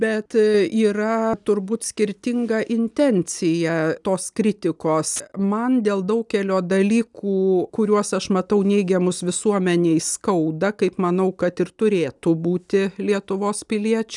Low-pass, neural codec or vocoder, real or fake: 10.8 kHz; none; real